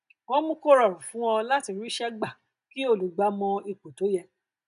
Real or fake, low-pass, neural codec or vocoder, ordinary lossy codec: real; 10.8 kHz; none; none